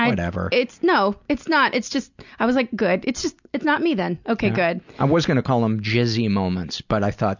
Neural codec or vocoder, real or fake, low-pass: none; real; 7.2 kHz